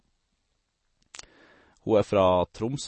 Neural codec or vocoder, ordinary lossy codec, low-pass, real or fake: none; MP3, 32 kbps; 10.8 kHz; real